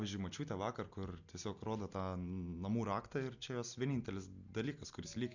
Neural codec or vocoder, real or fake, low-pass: none; real; 7.2 kHz